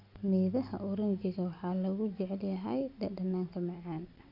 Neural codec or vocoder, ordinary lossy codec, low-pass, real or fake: none; none; 5.4 kHz; real